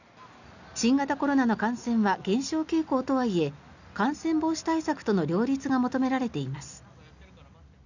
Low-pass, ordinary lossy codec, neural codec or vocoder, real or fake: 7.2 kHz; none; none; real